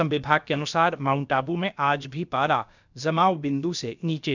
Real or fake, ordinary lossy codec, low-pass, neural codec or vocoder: fake; none; 7.2 kHz; codec, 16 kHz, about 1 kbps, DyCAST, with the encoder's durations